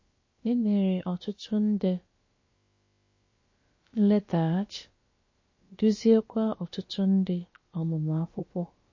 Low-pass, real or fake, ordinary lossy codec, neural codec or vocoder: 7.2 kHz; fake; MP3, 32 kbps; codec, 16 kHz, about 1 kbps, DyCAST, with the encoder's durations